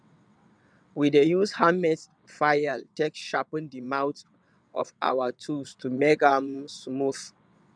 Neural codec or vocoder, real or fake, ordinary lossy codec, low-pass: vocoder, 22.05 kHz, 80 mel bands, WaveNeXt; fake; none; 9.9 kHz